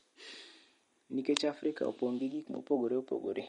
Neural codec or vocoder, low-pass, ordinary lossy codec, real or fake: vocoder, 44.1 kHz, 128 mel bands every 256 samples, BigVGAN v2; 19.8 kHz; MP3, 48 kbps; fake